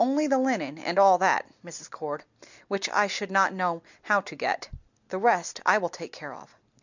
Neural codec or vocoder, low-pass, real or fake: none; 7.2 kHz; real